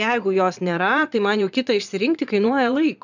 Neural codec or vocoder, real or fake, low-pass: vocoder, 22.05 kHz, 80 mel bands, Vocos; fake; 7.2 kHz